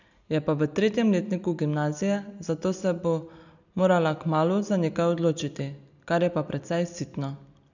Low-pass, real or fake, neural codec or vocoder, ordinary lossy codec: 7.2 kHz; real; none; none